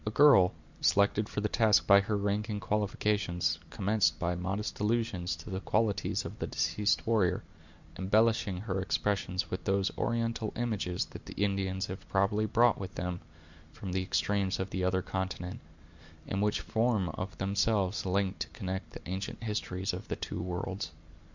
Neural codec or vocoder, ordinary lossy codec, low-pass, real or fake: none; Opus, 64 kbps; 7.2 kHz; real